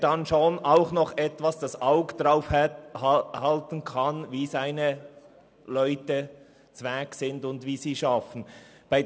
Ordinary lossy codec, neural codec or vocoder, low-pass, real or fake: none; none; none; real